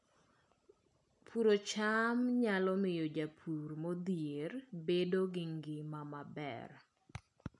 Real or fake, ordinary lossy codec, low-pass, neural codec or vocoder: real; none; 10.8 kHz; none